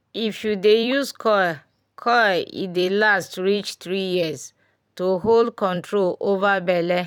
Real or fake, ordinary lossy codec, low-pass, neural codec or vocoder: fake; none; 19.8 kHz; vocoder, 44.1 kHz, 128 mel bands every 256 samples, BigVGAN v2